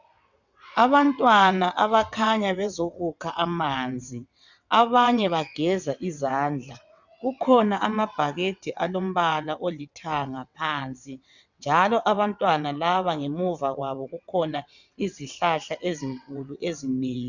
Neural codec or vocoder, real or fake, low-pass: vocoder, 22.05 kHz, 80 mel bands, WaveNeXt; fake; 7.2 kHz